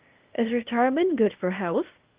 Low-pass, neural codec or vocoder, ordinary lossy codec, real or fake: 3.6 kHz; codec, 16 kHz, 0.8 kbps, ZipCodec; Opus, 32 kbps; fake